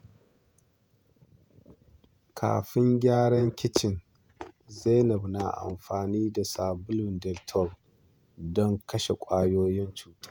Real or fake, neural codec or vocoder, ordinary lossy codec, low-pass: fake; vocoder, 48 kHz, 128 mel bands, Vocos; none; none